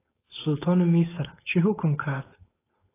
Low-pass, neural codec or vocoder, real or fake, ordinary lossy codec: 3.6 kHz; codec, 16 kHz, 4.8 kbps, FACodec; fake; AAC, 16 kbps